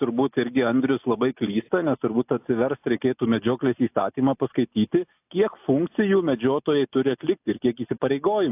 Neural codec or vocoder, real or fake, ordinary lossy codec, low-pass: none; real; AAC, 32 kbps; 3.6 kHz